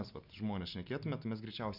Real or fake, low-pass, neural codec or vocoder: real; 5.4 kHz; none